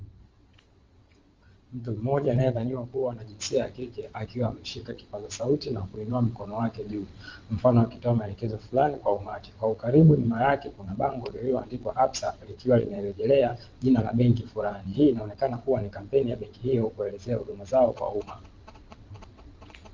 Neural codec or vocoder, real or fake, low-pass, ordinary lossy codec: vocoder, 22.05 kHz, 80 mel bands, WaveNeXt; fake; 7.2 kHz; Opus, 32 kbps